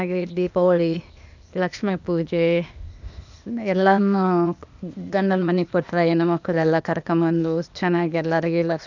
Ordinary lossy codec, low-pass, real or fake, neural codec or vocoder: none; 7.2 kHz; fake; codec, 16 kHz, 0.8 kbps, ZipCodec